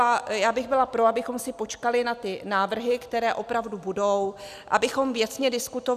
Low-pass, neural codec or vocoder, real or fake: 14.4 kHz; none; real